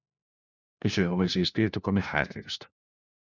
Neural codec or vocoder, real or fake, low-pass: codec, 16 kHz, 1 kbps, FunCodec, trained on LibriTTS, 50 frames a second; fake; 7.2 kHz